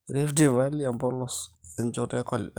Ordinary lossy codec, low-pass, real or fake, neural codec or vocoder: none; none; fake; codec, 44.1 kHz, 7.8 kbps, DAC